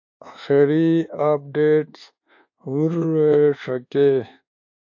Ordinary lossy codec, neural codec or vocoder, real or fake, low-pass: AAC, 48 kbps; codec, 24 kHz, 1.2 kbps, DualCodec; fake; 7.2 kHz